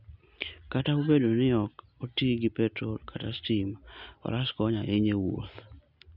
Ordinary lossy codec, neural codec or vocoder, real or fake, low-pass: none; none; real; 5.4 kHz